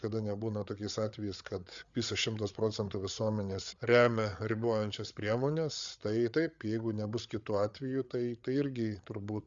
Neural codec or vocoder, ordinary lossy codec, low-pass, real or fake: codec, 16 kHz, 8 kbps, FreqCodec, larger model; Opus, 64 kbps; 7.2 kHz; fake